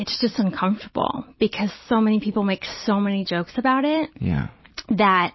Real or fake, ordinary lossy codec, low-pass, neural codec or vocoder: real; MP3, 24 kbps; 7.2 kHz; none